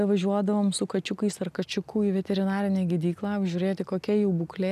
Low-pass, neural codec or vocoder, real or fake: 14.4 kHz; none; real